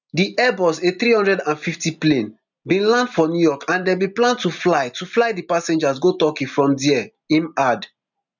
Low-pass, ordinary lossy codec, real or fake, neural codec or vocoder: 7.2 kHz; none; real; none